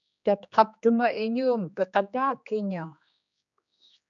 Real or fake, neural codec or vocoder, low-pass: fake; codec, 16 kHz, 2 kbps, X-Codec, HuBERT features, trained on general audio; 7.2 kHz